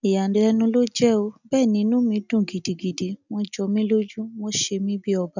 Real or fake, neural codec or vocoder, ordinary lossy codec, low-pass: real; none; none; 7.2 kHz